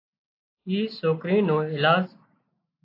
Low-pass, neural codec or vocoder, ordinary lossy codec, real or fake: 5.4 kHz; none; AAC, 32 kbps; real